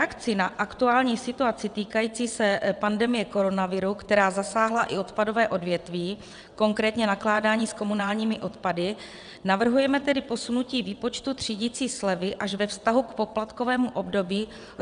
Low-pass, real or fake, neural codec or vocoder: 9.9 kHz; fake; vocoder, 22.05 kHz, 80 mel bands, WaveNeXt